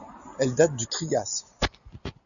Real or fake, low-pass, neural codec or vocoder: real; 7.2 kHz; none